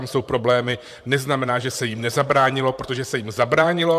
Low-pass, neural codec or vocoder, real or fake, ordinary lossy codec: 14.4 kHz; vocoder, 44.1 kHz, 128 mel bands, Pupu-Vocoder; fake; AAC, 96 kbps